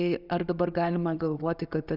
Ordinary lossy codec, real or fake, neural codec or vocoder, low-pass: AAC, 48 kbps; real; none; 5.4 kHz